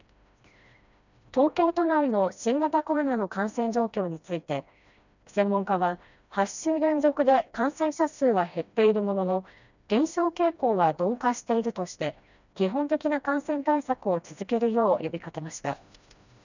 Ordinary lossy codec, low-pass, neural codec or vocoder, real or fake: none; 7.2 kHz; codec, 16 kHz, 1 kbps, FreqCodec, smaller model; fake